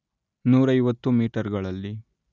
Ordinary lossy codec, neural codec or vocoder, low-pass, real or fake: MP3, 96 kbps; none; 7.2 kHz; real